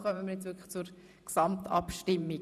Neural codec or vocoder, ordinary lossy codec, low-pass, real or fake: vocoder, 44.1 kHz, 128 mel bands every 512 samples, BigVGAN v2; none; 14.4 kHz; fake